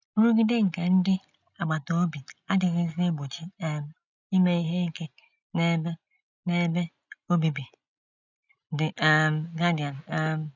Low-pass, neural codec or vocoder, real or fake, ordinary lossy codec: 7.2 kHz; none; real; none